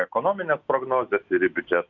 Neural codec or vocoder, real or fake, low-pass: none; real; 7.2 kHz